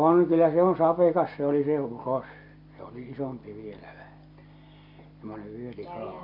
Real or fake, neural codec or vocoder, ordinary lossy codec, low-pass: real; none; none; 5.4 kHz